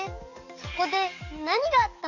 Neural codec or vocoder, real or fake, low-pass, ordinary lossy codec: codec, 44.1 kHz, 7.8 kbps, DAC; fake; 7.2 kHz; none